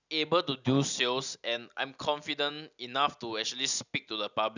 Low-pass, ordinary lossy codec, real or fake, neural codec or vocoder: 7.2 kHz; none; real; none